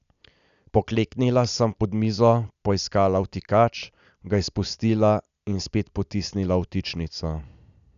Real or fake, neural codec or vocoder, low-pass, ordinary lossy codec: real; none; 7.2 kHz; none